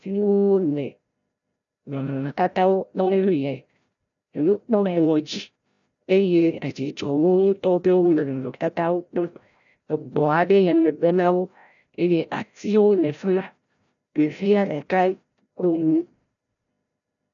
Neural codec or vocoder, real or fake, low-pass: codec, 16 kHz, 0.5 kbps, FreqCodec, larger model; fake; 7.2 kHz